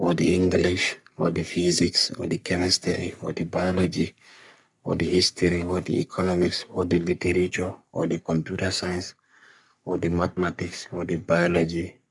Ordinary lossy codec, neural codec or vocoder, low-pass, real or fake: none; codec, 44.1 kHz, 3.4 kbps, Pupu-Codec; 10.8 kHz; fake